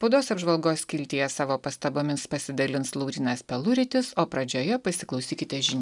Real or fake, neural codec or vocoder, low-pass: real; none; 10.8 kHz